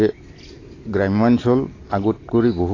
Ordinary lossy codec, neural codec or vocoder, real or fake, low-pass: AAC, 32 kbps; none; real; 7.2 kHz